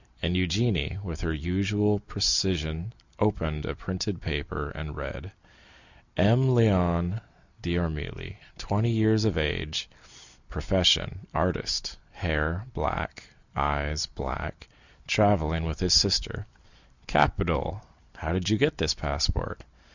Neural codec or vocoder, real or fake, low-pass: none; real; 7.2 kHz